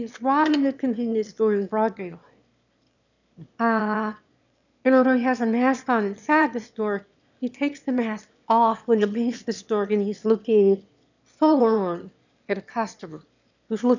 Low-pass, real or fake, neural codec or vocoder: 7.2 kHz; fake; autoencoder, 22.05 kHz, a latent of 192 numbers a frame, VITS, trained on one speaker